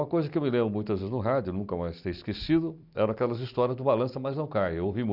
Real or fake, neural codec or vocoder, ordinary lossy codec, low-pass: real; none; none; 5.4 kHz